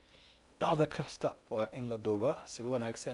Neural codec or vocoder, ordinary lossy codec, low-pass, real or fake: codec, 16 kHz in and 24 kHz out, 0.8 kbps, FocalCodec, streaming, 65536 codes; none; 10.8 kHz; fake